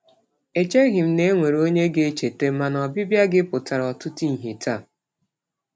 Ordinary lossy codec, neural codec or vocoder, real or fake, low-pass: none; none; real; none